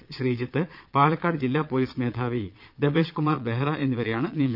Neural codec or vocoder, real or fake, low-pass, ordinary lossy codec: vocoder, 22.05 kHz, 80 mel bands, Vocos; fake; 5.4 kHz; none